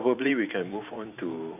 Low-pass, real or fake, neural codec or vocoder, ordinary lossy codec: 3.6 kHz; fake; vocoder, 44.1 kHz, 128 mel bands every 512 samples, BigVGAN v2; none